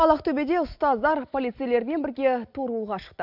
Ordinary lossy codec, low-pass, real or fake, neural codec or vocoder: none; 5.4 kHz; real; none